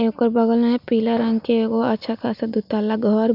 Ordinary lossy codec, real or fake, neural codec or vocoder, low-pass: none; real; none; 5.4 kHz